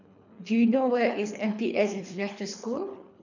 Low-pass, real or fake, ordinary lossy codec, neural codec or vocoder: 7.2 kHz; fake; none; codec, 24 kHz, 3 kbps, HILCodec